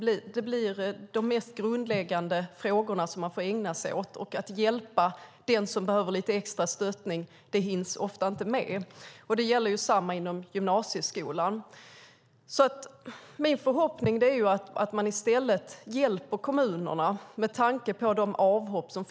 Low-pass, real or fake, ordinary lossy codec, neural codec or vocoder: none; real; none; none